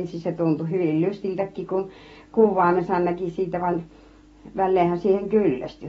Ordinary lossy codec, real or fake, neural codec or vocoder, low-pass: AAC, 24 kbps; real; none; 10.8 kHz